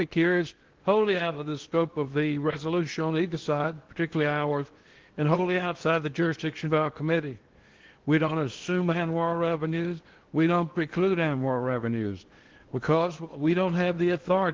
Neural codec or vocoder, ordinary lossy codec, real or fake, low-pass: codec, 16 kHz in and 24 kHz out, 0.8 kbps, FocalCodec, streaming, 65536 codes; Opus, 16 kbps; fake; 7.2 kHz